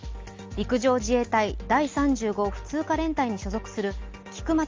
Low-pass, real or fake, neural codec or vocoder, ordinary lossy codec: 7.2 kHz; real; none; Opus, 32 kbps